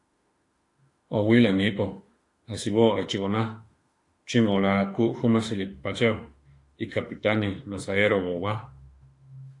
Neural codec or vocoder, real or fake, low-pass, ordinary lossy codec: autoencoder, 48 kHz, 32 numbers a frame, DAC-VAE, trained on Japanese speech; fake; 10.8 kHz; AAC, 48 kbps